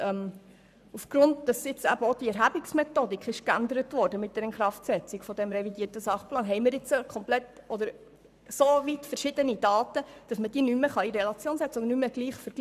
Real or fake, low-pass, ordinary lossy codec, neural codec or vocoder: fake; 14.4 kHz; none; codec, 44.1 kHz, 7.8 kbps, Pupu-Codec